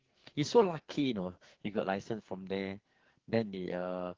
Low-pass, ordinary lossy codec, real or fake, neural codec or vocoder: 7.2 kHz; Opus, 16 kbps; fake; codec, 44.1 kHz, 2.6 kbps, SNAC